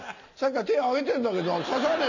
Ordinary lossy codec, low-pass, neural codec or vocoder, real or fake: none; 7.2 kHz; none; real